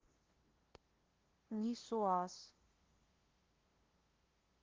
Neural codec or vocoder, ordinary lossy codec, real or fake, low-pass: codec, 16 kHz, 0.5 kbps, FunCodec, trained on LibriTTS, 25 frames a second; Opus, 16 kbps; fake; 7.2 kHz